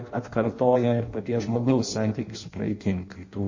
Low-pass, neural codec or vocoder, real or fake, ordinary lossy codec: 7.2 kHz; codec, 16 kHz in and 24 kHz out, 0.6 kbps, FireRedTTS-2 codec; fake; MP3, 32 kbps